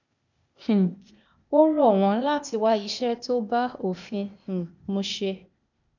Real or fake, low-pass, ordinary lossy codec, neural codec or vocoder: fake; 7.2 kHz; none; codec, 16 kHz, 0.8 kbps, ZipCodec